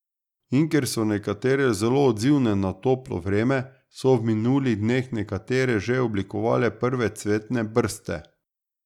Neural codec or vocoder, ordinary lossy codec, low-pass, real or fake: none; none; 19.8 kHz; real